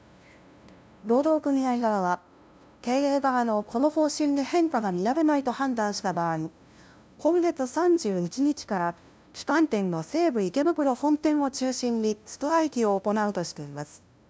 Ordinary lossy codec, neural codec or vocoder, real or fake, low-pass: none; codec, 16 kHz, 0.5 kbps, FunCodec, trained on LibriTTS, 25 frames a second; fake; none